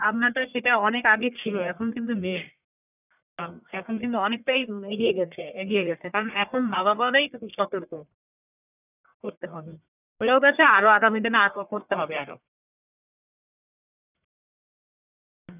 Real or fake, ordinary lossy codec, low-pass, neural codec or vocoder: fake; none; 3.6 kHz; codec, 44.1 kHz, 1.7 kbps, Pupu-Codec